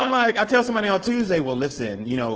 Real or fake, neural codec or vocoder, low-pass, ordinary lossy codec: fake; codec, 16 kHz, 4.8 kbps, FACodec; 7.2 kHz; Opus, 16 kbps